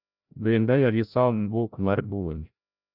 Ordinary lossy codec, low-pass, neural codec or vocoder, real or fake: none; 5.4 kHz; codec, 16 kHz, 0.5 kbps, FreqCodec, larger model; fake